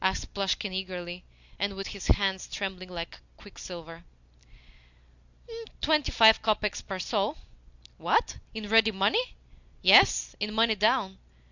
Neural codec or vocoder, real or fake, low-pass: none; real; 7.2 kHz